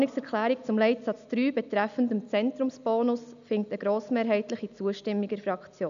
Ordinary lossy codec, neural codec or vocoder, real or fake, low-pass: none; none; real; 7.2 kHz